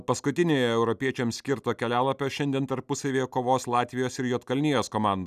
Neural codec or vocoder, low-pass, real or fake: none; 14.4 kHz; real